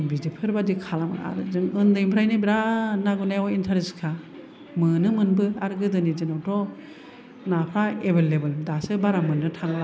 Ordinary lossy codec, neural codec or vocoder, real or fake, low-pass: none; none; real; none